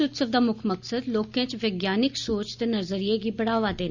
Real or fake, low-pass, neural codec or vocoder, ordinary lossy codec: real; 7.2 kHz; none; Opus, 64 kbps